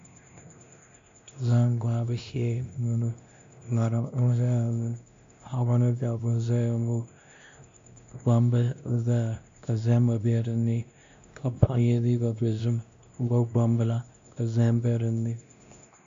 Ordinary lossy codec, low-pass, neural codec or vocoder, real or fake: MP3, 48 kbps; 7.2 kHz; codec, 16 kHz, 1 kbps, X-Codec, WavLM features, trained on Multilingual LibriSpeech; fake